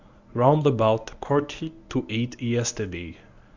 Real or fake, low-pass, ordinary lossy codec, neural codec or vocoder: fake; 7.2 kHz; Opus, 64 kbps; codec, 24 kHz, 0.9 kbps, WavTokenizer, medium speech release version 1